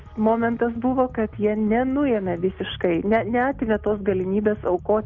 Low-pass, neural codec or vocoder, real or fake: 7.2 kHz; none; real